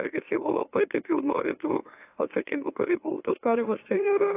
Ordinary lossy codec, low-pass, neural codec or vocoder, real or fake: AAC, 24 kbps; 3.6 kHz; autoencoder, 44.1 kHz, a latent of 192 numbers a frame, MeloTTS; fake